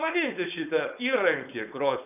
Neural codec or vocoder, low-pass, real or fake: codec, 16 kHz, 4.8 kbps, FACodec; 3.6 kHz; fake